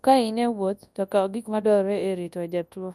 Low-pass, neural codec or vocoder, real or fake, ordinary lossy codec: none; codec, 24 kHz, 0.9 kbps, WavTokenizer, large speech release; fake; none